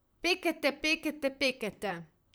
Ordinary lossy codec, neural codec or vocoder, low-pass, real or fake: none; vocoder, 44.1 kHz, 128 mel bands, Pupu-Vocoder; none; fake